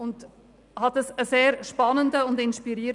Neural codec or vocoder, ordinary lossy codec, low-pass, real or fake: none; none; 10.8 kHz; real